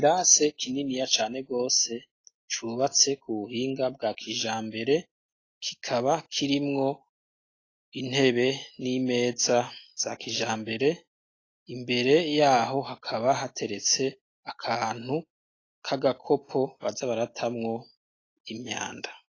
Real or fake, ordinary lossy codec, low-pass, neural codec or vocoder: real; AAC, 32 kbps; 7.2 kHz; none